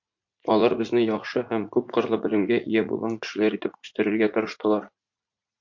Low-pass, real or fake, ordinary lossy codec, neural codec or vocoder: 7.2 kHz; fake; MP3, 48 kbps; vocoder, 44.1 kHz, 80 mel bands, Vocos